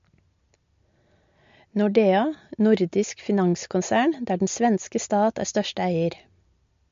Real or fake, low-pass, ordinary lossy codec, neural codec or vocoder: real; 7.2 kHz; MP3, 48 kbps; none